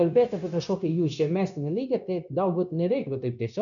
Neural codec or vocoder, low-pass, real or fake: codec, 16 kHz, 0.9 kbps, LongCat-Audio-Codec; 7.2 kHz; fake